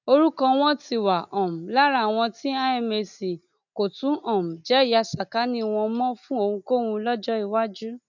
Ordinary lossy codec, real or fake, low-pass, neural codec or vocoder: none; real; 7.2 kHz; none